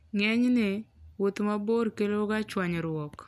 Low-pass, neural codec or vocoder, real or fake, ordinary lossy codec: none; none; real; none